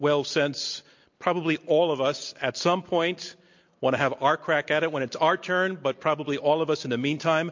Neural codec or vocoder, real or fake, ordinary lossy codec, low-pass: none; real; MP3, 48 kbps; 7.2 kHz